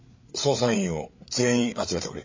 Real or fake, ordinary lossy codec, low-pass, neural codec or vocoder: fake; MP3, 32 kbps; 7.2 kHz; codec, 16 kHz, 8 kbps, FreqCodec, larger model